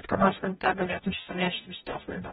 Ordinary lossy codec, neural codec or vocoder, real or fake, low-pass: AAC, 16 kbps; codec, 44.1 kHz, 0.9 kbps, DAC; fake; 19.8 kHz